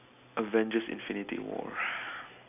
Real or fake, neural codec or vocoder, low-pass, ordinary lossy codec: real; none; 3.6 kHz; none